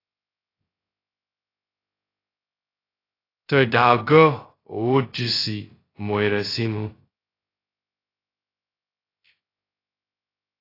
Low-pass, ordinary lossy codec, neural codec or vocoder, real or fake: 5.4 kHz; AAC, 24 kbps; codec, 16 kHz, 0.2 kbps, FocalCodec; fake